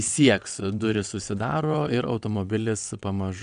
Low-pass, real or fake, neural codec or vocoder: 9.9 kHz; fake; vocoder, 22.05 kHz, 80 mel bands, WaveNeXt